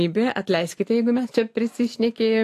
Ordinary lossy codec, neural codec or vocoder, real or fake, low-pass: AAC, 64 kbps; none; real; 14.4 kHz